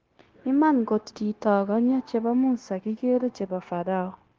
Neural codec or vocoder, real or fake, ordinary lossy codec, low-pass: codec, 16 kHz, 0.9 kbps, LongCat-Audio-Codec; fake; Opus, 16 kbps; 7.2 kHz